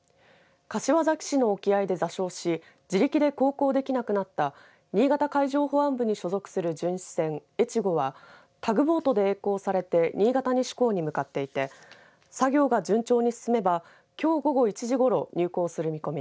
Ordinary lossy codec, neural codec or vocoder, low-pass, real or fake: none; none; none; real